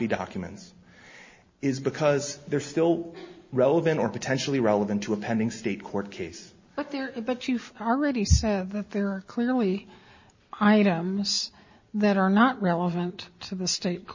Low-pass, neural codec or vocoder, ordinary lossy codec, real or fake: 7.2 kHz; none; MP3, 32 kbps; real